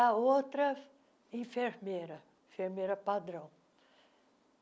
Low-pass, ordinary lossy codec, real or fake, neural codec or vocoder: none; none; real; none